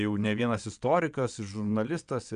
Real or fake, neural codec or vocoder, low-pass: fake; vocoder, 22.05 kHz, 80 mel bands, WaveNeXt; 9.9 kHz